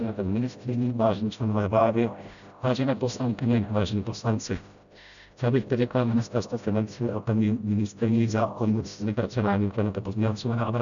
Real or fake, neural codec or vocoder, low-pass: fake; codec, 16 kHz, 0.5 kbps, FreqCodec, smaller model; 7.2 kHz